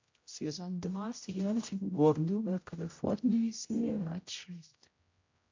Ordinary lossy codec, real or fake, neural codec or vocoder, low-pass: MP3, 48 kbps; fake; codec, 16 kHz, 0.5 kbps, X-Codec, HuBERT features, trained on general audio; 7.2 kHz